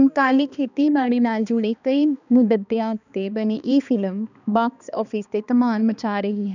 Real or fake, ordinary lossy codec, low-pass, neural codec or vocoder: fake; none; 7.2 kHz; codec, 16 kHz, 2 kbps, X-Codec, HuBERT features, trained on balanced general audio